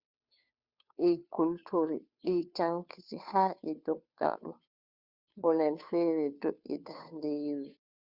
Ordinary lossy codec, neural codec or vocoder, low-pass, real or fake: Opus, 64 kbps; codec, 16 kHz, 2 kbps, FunCodec, trained on Chinese and English, 25 frames a second; 5.4 kHz; fake